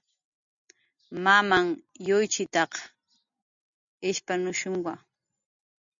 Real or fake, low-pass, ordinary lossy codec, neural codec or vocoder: real; 7.2 kHz; MP3, 64 kbps; none